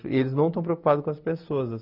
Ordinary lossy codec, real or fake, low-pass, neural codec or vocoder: none; real; 5.4 kHz; none